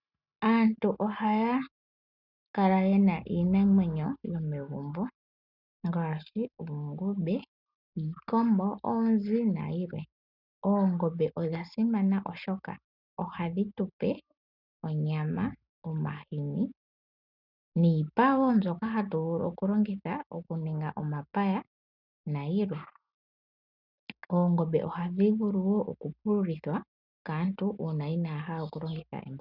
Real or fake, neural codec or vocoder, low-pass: real; none; 5.4 kHz